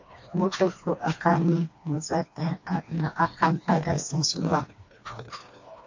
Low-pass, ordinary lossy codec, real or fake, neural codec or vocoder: 7.2 kHz; AAC, 48 kbps; fake; codec, 16 kHz, 2 kbps, FreqCodec, smaller model